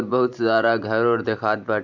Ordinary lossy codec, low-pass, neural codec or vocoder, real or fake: none; 7.2 kHz; vocoder, 44.1 kHz, 128 mel bands every 512 samples, BigVGAN v2; fake